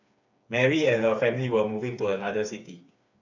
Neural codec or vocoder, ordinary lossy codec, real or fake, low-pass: codec, 16 kHz, 4 kbps, FreqCodec, smaller model; none; fake; 7.2 kHz